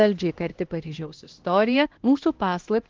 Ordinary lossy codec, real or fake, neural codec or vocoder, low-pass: Opus, 16 kbps; fake; codec, 16 kHz, 1 kbps, X-Codec, HuBERT features, trained on LibriSpeech; 7.2 kHz